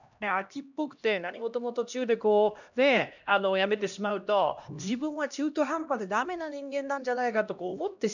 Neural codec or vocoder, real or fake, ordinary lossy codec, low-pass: codec, 16 kHz, 1 kbps, X-Codec, HuBERT features, trained on LibriSpeech; fake; none; 7.2 kHz